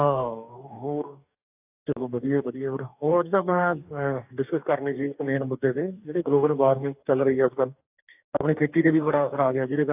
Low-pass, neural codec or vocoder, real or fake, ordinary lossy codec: 3.6 kHz; codec, 44.1 kHz, 2.6 kbps, DAC; fake; AAC, 32 kbps